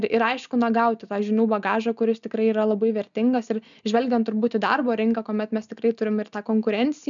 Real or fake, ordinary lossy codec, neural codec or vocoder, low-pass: real; MP3, 96 kbps; none; 7.2 kHz